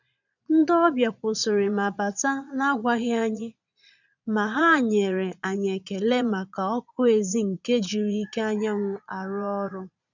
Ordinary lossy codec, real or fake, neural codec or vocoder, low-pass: none; fake; vocoder, 22.05 kHz, 80 mel bands, Vocos; 7.2 kHz